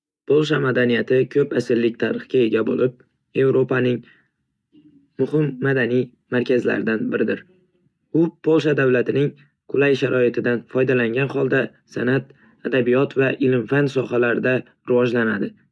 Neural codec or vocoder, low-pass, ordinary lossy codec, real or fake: none; none; none; real